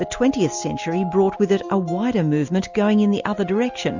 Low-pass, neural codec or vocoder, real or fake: 7.2 kHz; none; real